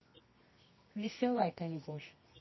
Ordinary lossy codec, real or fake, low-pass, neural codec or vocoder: MP3, 24 kbps; fake; 7.2 kHz; codec, 24 kHz, 0.9 kbps, WavTokenizer, medium music audio release